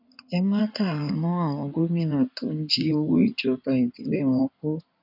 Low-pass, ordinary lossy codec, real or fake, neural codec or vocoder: 5.4 kHz; none; fake; codec, 16 kHz in and 24 kHz out, 2.2 kbps, FireRedTTS-2 codec